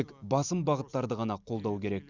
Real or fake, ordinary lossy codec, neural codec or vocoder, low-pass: real; Opus, 64 kbps; none; 7.2 kHz